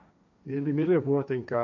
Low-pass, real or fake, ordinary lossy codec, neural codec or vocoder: 7.2 kHz; fake; none; codec, 16 kHz, 1.1 kbps, Voila-Tokenizer